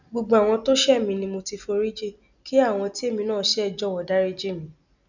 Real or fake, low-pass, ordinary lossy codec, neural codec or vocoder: real; 7.2 kHz; none; none